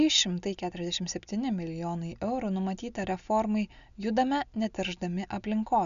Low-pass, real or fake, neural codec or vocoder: 7.2 kHz; real; none